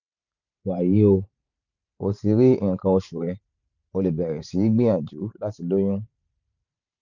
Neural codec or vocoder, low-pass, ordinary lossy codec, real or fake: vocoder, 44.1 kHz, 128 mel bands every 512 samples, BigVGAN v2; 7.2 kHz; none; fake